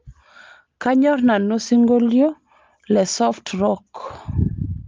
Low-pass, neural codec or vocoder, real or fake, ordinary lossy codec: 7.2 kHz; none; real; Opus, 32 kbps